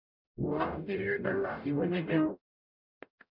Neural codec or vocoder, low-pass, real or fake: codec, 44.1 kHz, 0.9 kbps, DAC; 5.4 kHz; fake